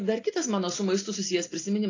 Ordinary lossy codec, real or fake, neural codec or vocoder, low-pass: AAC, 32 kbps; real; none; 7.2 kHz